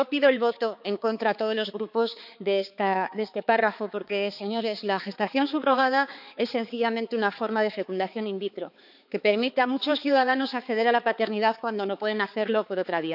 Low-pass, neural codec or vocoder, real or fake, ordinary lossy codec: 5.4 kHz; codec, 16 kHz, 4 kbps, X-Codec, HuBERT features, trained on balanced general audio; fake; none